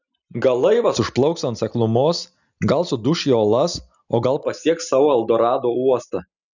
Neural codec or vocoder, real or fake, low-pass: none; real; 7.2 kHz